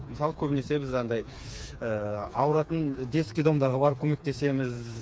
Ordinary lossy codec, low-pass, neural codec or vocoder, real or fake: none; none; codec, 16 kHz, 4 kbps, FreqCodec, smaller model; fake